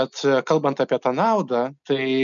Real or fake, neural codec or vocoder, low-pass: real; none; 7.2 kHz